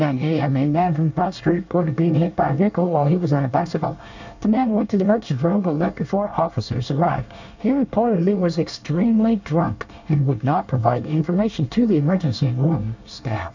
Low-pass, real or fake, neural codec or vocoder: 7.2 kHz; fake; codec, 24 kHz, 1 kbps, SNAC